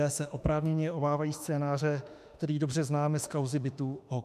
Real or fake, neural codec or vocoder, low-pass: fake; autoencoder, 48 kHz, 32 numbers a frame, DAC-VAE, trained on Japanese speech; 14.4 kHz